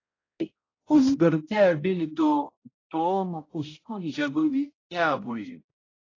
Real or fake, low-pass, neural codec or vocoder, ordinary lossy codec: fake; 7.2 kHz; codec, 16 kHz, 0.5 kbps, X-Codec, HuBERT features, trained on balanced general audio; AAC, 32 kbps